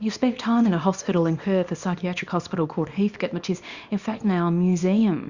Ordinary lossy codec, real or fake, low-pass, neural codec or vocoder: Opus, 64 kbps; fake; 7.2 kHz; codec, 24 kHz, 0.9 kbps, WavTokenizer, small release